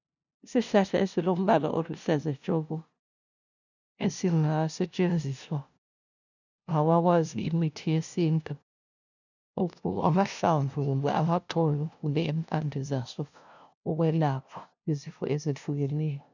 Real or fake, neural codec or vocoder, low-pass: fake; codec, 16 kHz, 0.5 kbps, FunCodec, trained on LibriTTS, 25 frames a second; 7.2 kHz